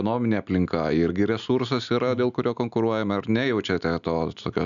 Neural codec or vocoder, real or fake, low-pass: none; real; 7.2 kHz